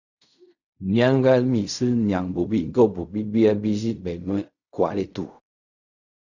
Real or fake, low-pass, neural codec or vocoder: fake; 7.2 kHz; codec, 16 kHz in and 24 kHz out, 0.4 kbps, LongCat-Audio-Codec, fine tuned four codebook decoder